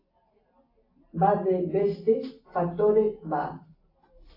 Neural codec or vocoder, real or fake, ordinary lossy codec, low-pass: none; real; AAC, 24 kbps; 5.4 kHz